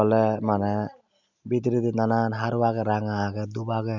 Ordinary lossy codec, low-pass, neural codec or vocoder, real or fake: none; 7.2 kHz; none; real